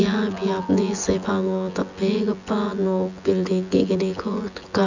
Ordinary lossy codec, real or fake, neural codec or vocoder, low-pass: none; fake; vocoder, 24 kHz, 100 mel bands, Vocos; 7.2 kHz